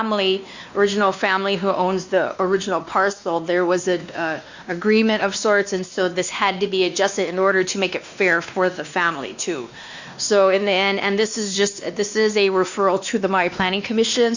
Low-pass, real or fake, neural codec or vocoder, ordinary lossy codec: 7.2 kHz; fake; codec, 16 kHz, 2 kbps, X-Codec, WavLM features, trained on Multilingual LibriSpeech; Opus, 64 kbps